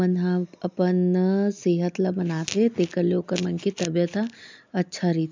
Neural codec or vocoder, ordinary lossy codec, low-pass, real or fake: none; MP3, 64 kbps; 7.2 kHz; real